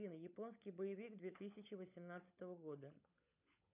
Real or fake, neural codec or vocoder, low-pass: fake; codec, 16 kHz, 16 kbps, FunCodec, trained on LibriTTS, 50 frames a second; 3.6 kHz